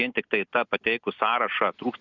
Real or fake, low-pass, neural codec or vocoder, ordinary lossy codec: real; 7.2 kHz; none; Opus, 64 kbps